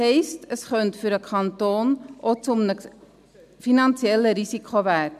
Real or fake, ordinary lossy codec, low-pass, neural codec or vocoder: real; none; 14.4 kHz; none